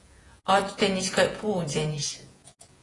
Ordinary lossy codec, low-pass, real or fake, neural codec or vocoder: AAC, 32 kbps; 10.8 kHz; fake; vocoder, 48 kHz, 128 mel bands, Vocos